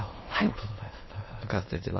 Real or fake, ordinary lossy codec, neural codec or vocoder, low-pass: fake; MP3, 24 kbps; autoencoder, 22.05 kHz, a latent of 192 numbers a frame, VITS, trained on many speakers; 7.2 kHz